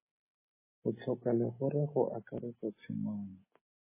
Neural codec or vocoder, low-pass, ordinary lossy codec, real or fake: none; 3.6 kHz; MP3, 16 kbps; real